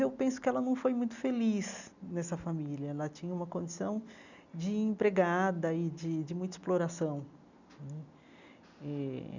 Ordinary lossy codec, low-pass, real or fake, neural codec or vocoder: none; 7.2 kHz; real; none